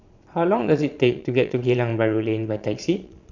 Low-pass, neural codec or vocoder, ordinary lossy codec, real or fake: 7.2 kHz; vocoder, 22.05 kHz, 80 mel bands, Vocos; Opus, 64 kbps; fake